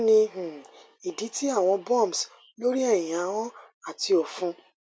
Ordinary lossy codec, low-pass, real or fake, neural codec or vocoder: none; none; real; none